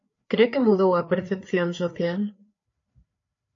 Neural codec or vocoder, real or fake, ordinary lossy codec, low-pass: codec, 16 kHz, 4 kbps, FreqCodec, larger model; fake; AAC, 48 kbps; 7.2 kHz